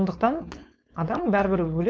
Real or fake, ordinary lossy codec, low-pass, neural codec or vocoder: fake; none; none; codec, 16 kHz, 4.8 kbps, FACodec